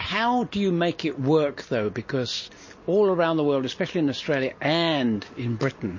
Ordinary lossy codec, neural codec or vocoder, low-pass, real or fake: MP3, 32 kbps; none; 7.2 kHz; real